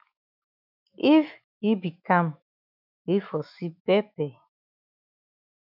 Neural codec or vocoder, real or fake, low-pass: autoencoder, 48 kHz, 128 numbers a frame, DAC-VAE, trained on Japanese speech; fake; 5.4 kHz